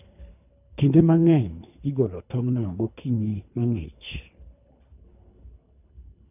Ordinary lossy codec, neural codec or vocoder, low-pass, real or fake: none; codec, 24 kHz, 3 kbps, HILCodec; 3.6 kHz; fake